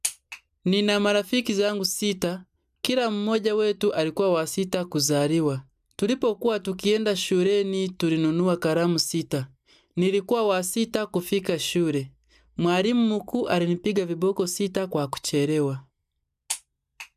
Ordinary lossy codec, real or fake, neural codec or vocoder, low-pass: none; real; none; 14.4 kHz